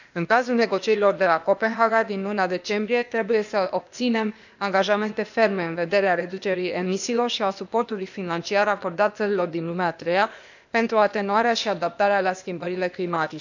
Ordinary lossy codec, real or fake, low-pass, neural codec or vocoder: none; fake; 7.2 kHz; codec, 16 kHz, 0.8 kbps, ZipCodec